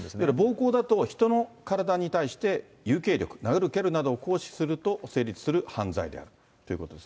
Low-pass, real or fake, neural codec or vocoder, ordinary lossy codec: none; real; none; none